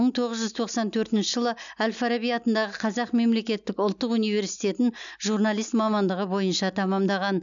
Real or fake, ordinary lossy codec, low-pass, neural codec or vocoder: real; none; 7.2 kHz; none